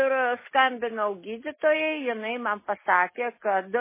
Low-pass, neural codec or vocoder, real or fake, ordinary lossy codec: 3.6 kHz; none; real; MP3, 16 kbps